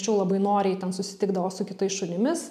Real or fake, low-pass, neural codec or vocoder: real; 14.4 kHz; none